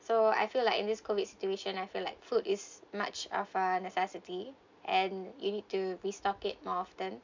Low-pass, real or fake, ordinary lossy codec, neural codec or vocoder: 7.2 kHz; real; MP3, 64 kbps; none